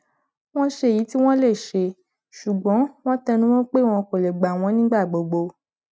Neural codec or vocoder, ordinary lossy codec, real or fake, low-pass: none; none; real; none